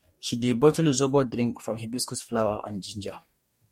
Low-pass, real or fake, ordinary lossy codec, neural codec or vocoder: 19.8 kHz; fake; MP3, 64 kbps; codec, 44.1 kHz, 2.6 kbps, DAC